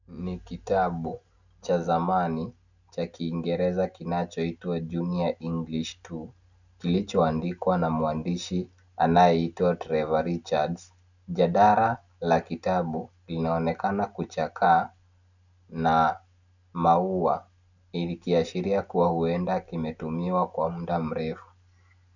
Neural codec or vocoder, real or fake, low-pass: none; real; 7.2 kHz